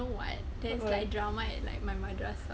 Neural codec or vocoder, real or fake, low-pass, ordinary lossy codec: none; real; none; none